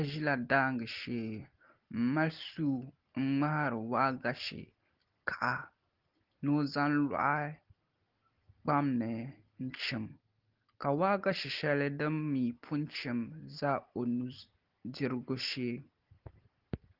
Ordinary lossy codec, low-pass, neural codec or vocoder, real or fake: Opus, 16 kbps; 5.4 kHz; none; real